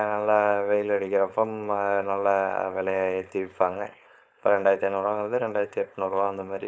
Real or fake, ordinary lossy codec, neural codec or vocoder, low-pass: fake; none; codec, 16 kHz, 4.8 kbps, FACodec; none